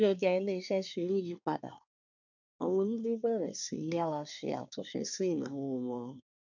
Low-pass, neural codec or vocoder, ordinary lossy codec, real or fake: 7.2 kHz; codec, 24 kHz, 1 kbps, SNAC; none; fake